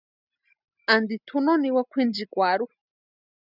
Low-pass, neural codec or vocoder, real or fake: 5.4 kHz; none; real